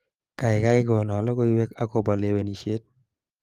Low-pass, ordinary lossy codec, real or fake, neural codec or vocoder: 14.4 kHz; Opus, 32 kbps; fake; codec, 44.1 kHz, 7.8 kbps, DAC